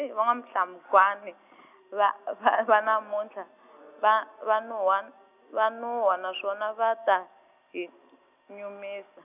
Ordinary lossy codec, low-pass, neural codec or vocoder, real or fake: none; 3.6 kHz; none; real